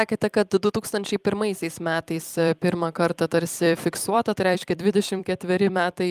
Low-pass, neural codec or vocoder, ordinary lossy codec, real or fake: 14.4 kHz; vocoder, 44.1 kHz, 128 mel bands every 256 samples, BigVGAN v2; Opus, 32 kbps; fake